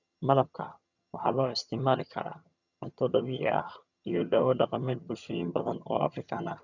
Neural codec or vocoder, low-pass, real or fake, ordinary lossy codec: vocoder, 22.05 kHz, 80 mel bands, HiFi-GAN; 7.2 kHz; fake; none